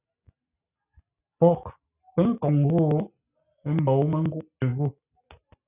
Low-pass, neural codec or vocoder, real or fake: 3.6 kHz; none; real